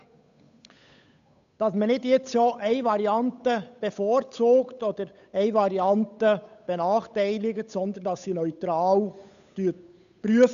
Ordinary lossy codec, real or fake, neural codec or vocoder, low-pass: none; fake; codec, 16 kHz, 8 kbps, FunCodec, trained on Chinese and English, 25 frames a second; 7.2 kHz